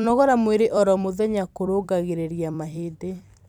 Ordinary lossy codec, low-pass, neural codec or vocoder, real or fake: none; 19.8 kHz; vocoder, 44.1 kHz, 128 mel bands every 256 samples, BigVGAN v2; fake